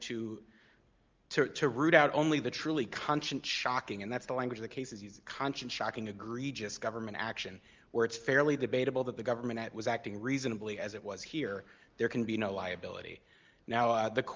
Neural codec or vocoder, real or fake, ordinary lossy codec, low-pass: none; real; Opus, 32 kbps; 7.2 kHz